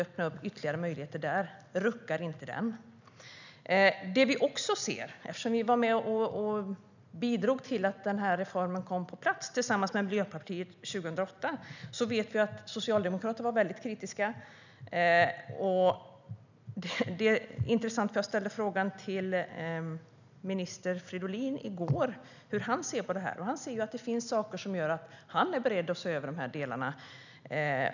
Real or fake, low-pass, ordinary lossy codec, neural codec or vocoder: real; 7.2 kHz; none; none